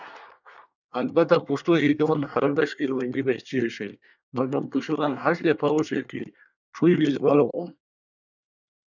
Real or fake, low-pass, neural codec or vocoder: fake; 7.2 kHz; codec, 24 kHz, 1 kbps, SNAC